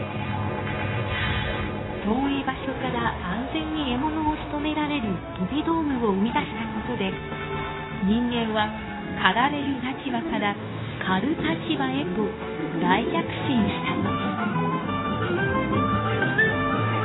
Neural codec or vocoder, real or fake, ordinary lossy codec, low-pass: codec, 16 kHz, 0.9 kbps, LongCat-Audio-Codec; fake; AAC, 16 kbps; 7.2 kHz